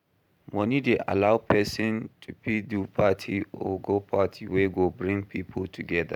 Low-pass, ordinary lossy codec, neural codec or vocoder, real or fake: 19.8 kHz; none; vocoder, 44.1 kHz, 128 mel bands every 512 samples, BigVGAN v2; fake